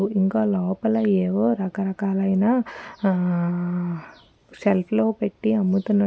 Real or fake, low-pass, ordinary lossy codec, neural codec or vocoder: real; none; none; none